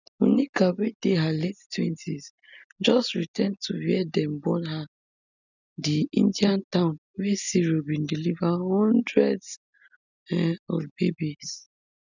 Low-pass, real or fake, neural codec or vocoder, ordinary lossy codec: 7.2 kHz; real; none; none